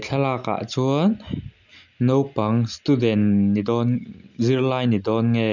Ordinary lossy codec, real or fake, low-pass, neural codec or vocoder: none; real; 7.2 kHz; none